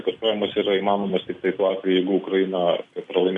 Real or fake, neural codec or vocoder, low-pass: real; none; 10.8 kHz